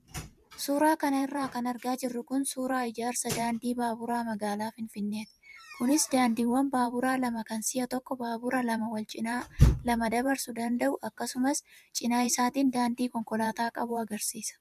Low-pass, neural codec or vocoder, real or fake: 14.4 kHz; vocoder, 44.1 kHz, 128 mel bands every 512 samples, BigVGAN v2; fake